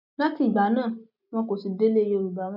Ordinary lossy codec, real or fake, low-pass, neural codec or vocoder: none; real; 5.4 kHz; none